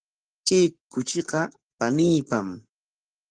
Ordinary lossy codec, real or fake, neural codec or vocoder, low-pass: Opus, 24 kbps; fake; codec, 44.1 kHz, 7.8 kbps, Pupu-Codec; 9.9 kHz